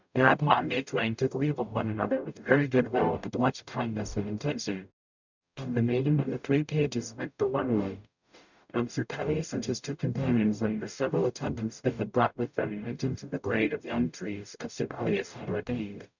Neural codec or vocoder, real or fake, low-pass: codec, 44.1 kHz, 0.9 kbps, DAC; fake; 7.2 kHz